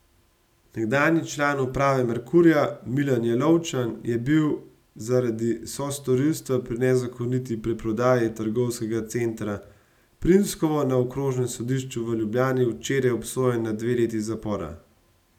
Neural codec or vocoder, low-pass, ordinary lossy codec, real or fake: none; 19.8 kHz; none; real